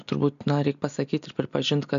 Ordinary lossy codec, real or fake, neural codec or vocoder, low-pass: MP3, 96 kbps; real; none; 7.2 kHz